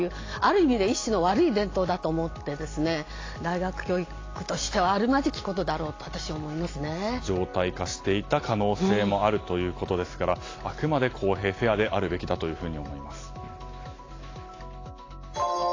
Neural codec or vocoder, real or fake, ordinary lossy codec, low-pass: none; real; AAC, 32 kbps; 7.2 kHz